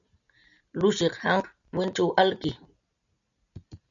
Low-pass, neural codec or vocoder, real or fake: 7.2 kHz; none; real